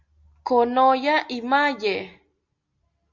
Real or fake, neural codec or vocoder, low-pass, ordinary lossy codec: real; none; 7.2 kHz; Opus, 64 kbps